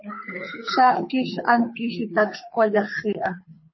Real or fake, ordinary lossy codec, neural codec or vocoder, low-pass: fake; MP3, 24 kbps; codec, 24 kHz, 6 kbps, HILCodec; 7.2 kHz